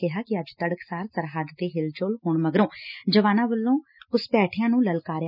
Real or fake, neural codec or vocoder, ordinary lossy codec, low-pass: real; none; none; 5.4 kHz